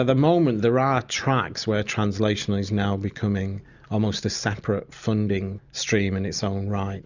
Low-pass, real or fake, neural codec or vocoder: 7.2 kHz; real; none